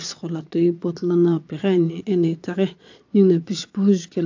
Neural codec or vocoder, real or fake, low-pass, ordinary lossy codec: vocoder, 22.05 kHz, 80 mel bands, Vocos; fake; 7.2 kHz; AAC, 48 kbps